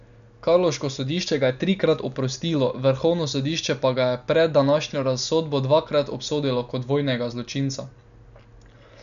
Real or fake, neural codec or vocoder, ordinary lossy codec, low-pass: real; none; none; 7.2 kHz